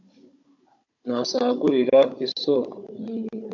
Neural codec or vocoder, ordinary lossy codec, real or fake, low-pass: codec, 16 kHz, 16 kbps, FunCodec, trained on Chinese and English, 50 frames a second; MP3, 48 kbps; fake; 7.2 kHz